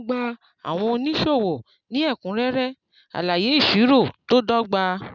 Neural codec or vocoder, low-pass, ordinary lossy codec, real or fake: none; 7.2 kHz; none; real